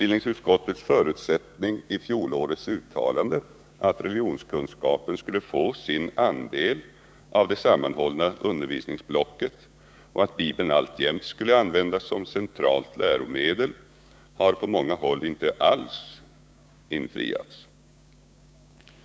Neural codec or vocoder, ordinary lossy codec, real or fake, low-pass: codec, 16 kHz, 6 kbps, DAC; none; fake; none